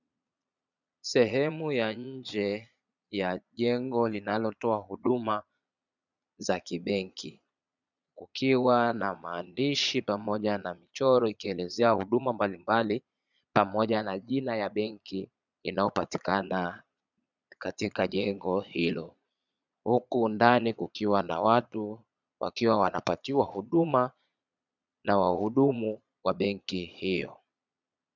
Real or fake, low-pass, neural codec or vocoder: fake; 7.2 kHz; vocoder, 22.05 kHz, 80 mel bands, Vocos